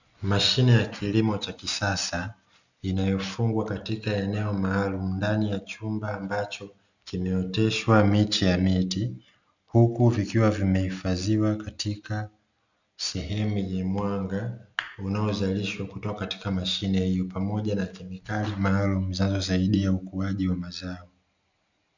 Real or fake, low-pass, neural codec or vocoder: real; 7.2 kHz; none